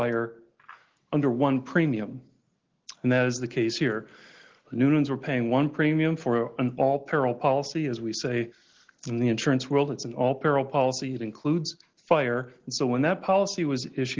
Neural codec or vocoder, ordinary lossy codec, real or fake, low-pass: none; Opus, 16 kbps; real; 7.2 kHz